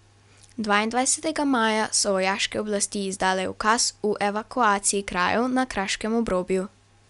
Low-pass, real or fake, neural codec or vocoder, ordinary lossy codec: 10.8 kHz; real; none; none